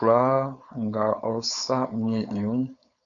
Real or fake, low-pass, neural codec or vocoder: fake; 7.2 kHz; codec, 16 kHz, 4.8 kbps, FACodec